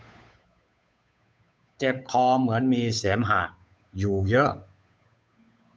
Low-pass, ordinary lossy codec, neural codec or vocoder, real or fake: none; none; codec, 16 kHz, 8 kbps, FunCodec, trained on Chinese and English, 25 frames a second; fake